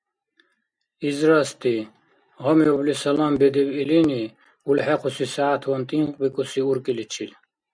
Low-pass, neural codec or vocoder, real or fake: 9.9 kHz; none; real